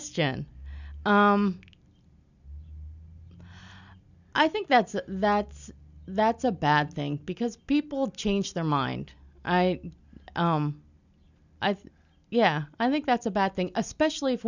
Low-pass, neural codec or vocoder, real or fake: 7.2 kHz; none; real